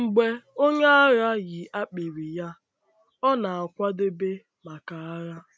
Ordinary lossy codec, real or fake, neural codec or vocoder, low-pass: none; real; none; none